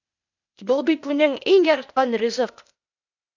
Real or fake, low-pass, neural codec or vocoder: fake; 7.2 kHz; codec, 16 kHz, 0.8 kbps, ZipCodec